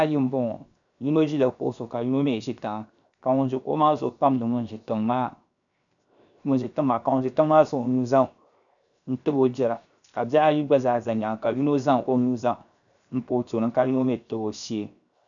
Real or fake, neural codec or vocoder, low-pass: fake; codec, 16 kHz, 0.7 kbps, FocalCodec; 7.2 kHz